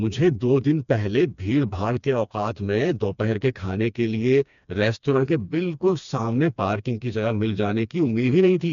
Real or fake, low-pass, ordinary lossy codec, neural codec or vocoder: fake; 7.2 kHz; MP3, 96 kbps; codec, 16 kHz, 2 kbps, FreqCodec, smaller model